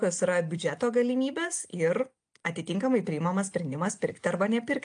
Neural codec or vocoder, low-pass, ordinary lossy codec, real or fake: none; 9.9 kHz; AAC, 64 kbps; real